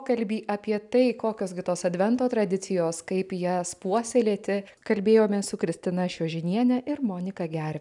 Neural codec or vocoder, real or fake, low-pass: none; real; 10.8 kHz